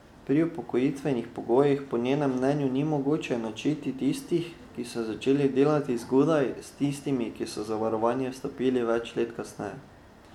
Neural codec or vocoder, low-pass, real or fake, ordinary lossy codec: none; 19.8 kHz; real; MP3, 96 kbps